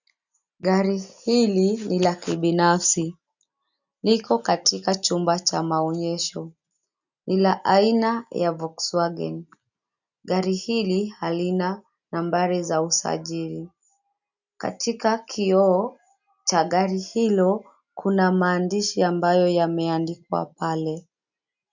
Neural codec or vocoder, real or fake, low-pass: none; real; 7.2 kHz